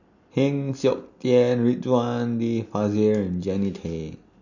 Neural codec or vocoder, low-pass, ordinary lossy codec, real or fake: none; 7.2 kHz; none; real